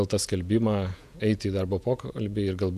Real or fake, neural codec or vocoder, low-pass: fake; vocoder, 48 kHz, 128 mel bands, Vocos; 14.4 kHz